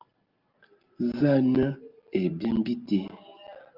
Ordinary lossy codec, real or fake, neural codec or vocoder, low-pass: Opus, 24 kbps; real; none; 5.4 kHz